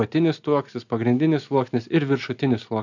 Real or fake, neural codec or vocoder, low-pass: real; none; 7.2 kHz